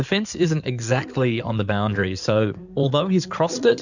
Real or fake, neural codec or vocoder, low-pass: fake; codec, 16 kHz in and 24 kHz out, 2.2 kbps, FireRedTTS-2 codec; 7.2 kHz